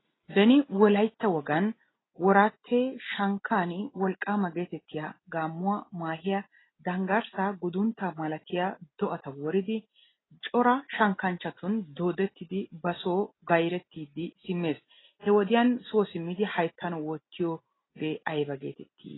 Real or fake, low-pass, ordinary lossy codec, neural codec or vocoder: real; 7.2 kHz; AAC, 16 kbps; none